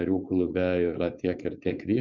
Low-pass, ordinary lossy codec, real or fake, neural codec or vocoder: 7.2 kHz; Opus, 64 kbps; fake; codec, 16 kHz, 4.8 kbps, FACodec